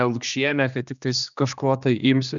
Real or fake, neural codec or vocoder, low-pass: fake; codec, 16 kHz, 2 kbps, X-Codec, HuBERT features, trained on general audio; 7.2 kHz